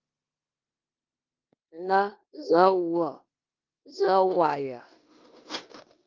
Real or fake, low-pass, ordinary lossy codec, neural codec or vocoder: fake; 7.2 kHz; Opus, 24 kbps; codec, 16 kHz in and 24 kHz out, 0.9 kbps, LongCat-Audio-Codec, four codebook decoder